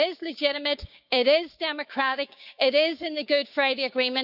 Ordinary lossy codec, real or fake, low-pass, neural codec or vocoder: none; fake; 5.4 kHz; codec, 16 kHz, 6 kbps, DAC